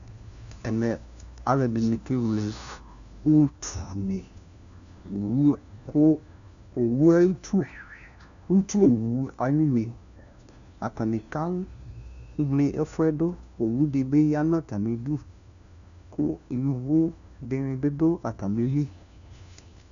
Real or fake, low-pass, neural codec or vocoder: fake; 7.2 kHz; codec, 16 kHz, 1 kbps, FunCodec, trained on LibriTTS, 50 frames a second